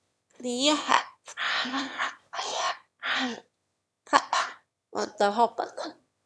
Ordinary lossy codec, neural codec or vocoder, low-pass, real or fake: none; autoencoder, 22.05 kHz, a latent of 192 numbers a frame, VITS, trained on one speaker; none; fake